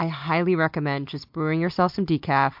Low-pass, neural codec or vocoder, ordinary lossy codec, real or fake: 5.4 kHz; none; MP3, 48 kbps; real